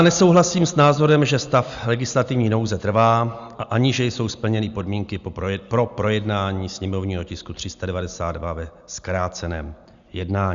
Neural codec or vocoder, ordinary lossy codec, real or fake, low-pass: none; Opus, 64 kbps; real; 7.2 kHz